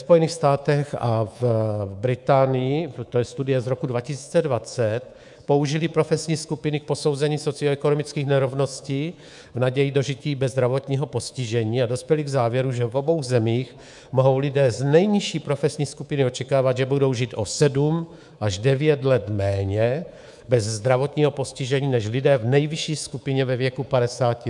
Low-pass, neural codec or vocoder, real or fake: 10.8 kHz; codec, 24 kHz, 3.1 kbps, DualCodec; fake